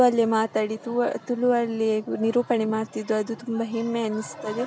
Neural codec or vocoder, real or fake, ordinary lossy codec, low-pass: none; real; none; none